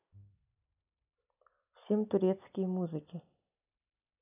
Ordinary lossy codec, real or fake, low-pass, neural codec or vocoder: none; real; 3.6 kHz; none